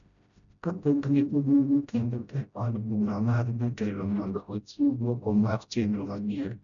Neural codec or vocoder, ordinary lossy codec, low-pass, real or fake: codec, 16 kHz, 0.5 kbps, FreqCodec, smaller model; none; 7.2 kHz; fake